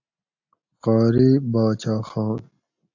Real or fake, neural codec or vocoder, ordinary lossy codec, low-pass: real; none; AAC, 48 kbps; 7.2 kHz